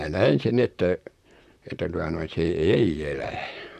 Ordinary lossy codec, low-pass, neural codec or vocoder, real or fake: none; 14.4 kHz; vocoder, 44.1 kHz, 128 mel bands, Pupu-Vocoder; fake